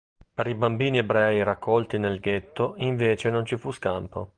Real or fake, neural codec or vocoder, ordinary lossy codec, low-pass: real; none; Opus, 24 kbps; 9.9 kHz